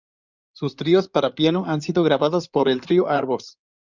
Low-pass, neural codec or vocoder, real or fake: 7.2 kHz; codec, 16 kHz in and 24 kHz out, 2.2 kbps, FireRedTTS-2 codec; fake